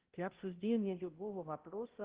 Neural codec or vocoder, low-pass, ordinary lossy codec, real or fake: codec, 16 kHz, 0.5 kbps, X-Codec, HuBERT features, trained on balanced general audio; 3.6 kHz; Opus, 16 kbps; fake